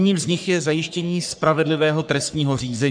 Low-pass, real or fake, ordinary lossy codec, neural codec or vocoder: 9.9 kHz; fake; MP3, 96 kbps; codec, 44.1 kHz, 3.4 kbps, Pupu-Codec